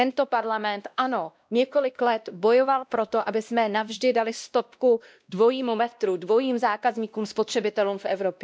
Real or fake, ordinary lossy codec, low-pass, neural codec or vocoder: fake; none; none; codec, 16 kHz, 1 kbps, X-Codec, WavLM features, trained on Multilingual LibriSpeech